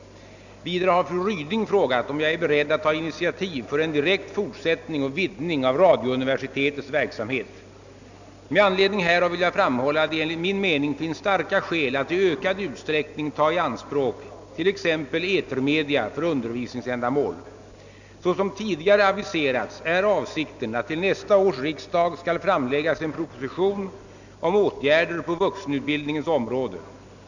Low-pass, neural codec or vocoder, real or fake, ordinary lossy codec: 7.2 kHz; none; real; none